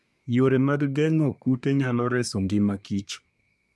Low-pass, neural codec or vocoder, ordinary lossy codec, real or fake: none; codec, 24 kHz, 1 kbps, SNAC; none; fake